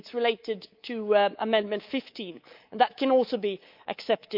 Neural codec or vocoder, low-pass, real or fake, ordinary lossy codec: codec, 24 kHz, 3.1 kbps, DualCodec; 5.4 kHz; fake; Opus, 24 kbps